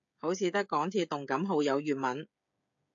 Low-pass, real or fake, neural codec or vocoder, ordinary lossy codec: 7.2 kHz; fake; codec, 16 kHz, 16 kbps, FreqCodec, smaller model; AAC, 64 kbps